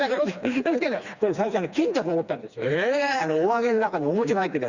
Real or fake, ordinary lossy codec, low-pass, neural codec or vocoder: fake; Opus, 64 kbps; 7.2 kHz; codec, 16 kHz, 2 kbps, FreqCodec, smaller model